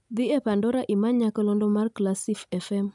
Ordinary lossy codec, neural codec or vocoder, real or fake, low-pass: none; none; real; 10.8 kHz